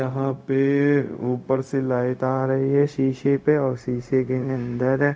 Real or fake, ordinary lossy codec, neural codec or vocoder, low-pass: fake; none; codec, 16 kHz, 0.4 kbps, LongCat-Audio-Codec; none